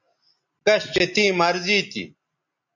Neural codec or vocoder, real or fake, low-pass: none; real; 7.2 kHz